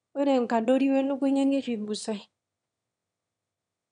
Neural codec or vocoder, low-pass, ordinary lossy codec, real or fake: autoencoder, 22.05 kHz, a latent of 192 numbers a frame, VITS, trained on one speaker; 9.9 kHz; none; fake